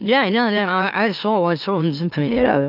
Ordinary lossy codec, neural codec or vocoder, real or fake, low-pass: AAC, 48 kbps; autoencoder, 44.1 kHz, a latent of 192 numbers a frame, MeloTTS; fake; 5.4 kHz